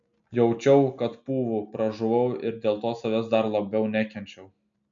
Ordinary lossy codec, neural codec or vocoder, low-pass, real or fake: MP3, 64 kbps; none; 7.2 kHz; real